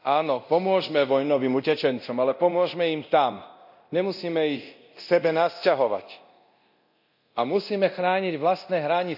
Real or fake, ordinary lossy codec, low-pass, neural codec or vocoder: fake; none; 5.4 kHz; codec, 24 kHz, 0.9 kbps, DualCodec